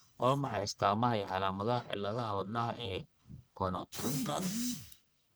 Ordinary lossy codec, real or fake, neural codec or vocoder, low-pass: none; fake; codec, 44.1 kHz, 1.7 kbps, Pupu-Codec; none